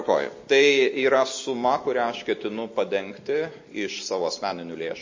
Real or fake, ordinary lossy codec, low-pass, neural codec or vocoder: real; MP3, 32 kbps; 7.2 kHz; none